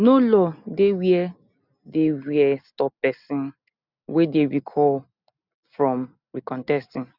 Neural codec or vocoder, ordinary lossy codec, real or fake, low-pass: none; none; real; 5.4 kHz